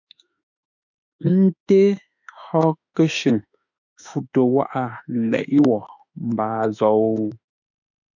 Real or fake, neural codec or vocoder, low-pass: fake; autoencoder, 48 kHz, 32 numbers a frame, DAC-VAE, trained on Japanese speech; 7.2 kHz